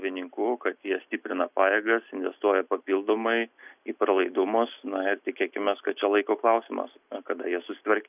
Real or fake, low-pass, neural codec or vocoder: real; 3.6 kHz; none